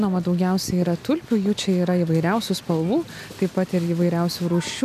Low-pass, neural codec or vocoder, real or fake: 14.4 kHz; none; real